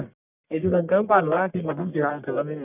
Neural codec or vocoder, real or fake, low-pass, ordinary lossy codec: codec, 44.1 kHz, 1.7 kbps, Pupu-Codec; fake; 3.6 kHz; none